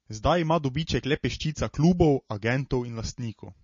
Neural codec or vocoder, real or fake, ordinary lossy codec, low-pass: none; real; MP3, 32 kbps; 7.2 kHz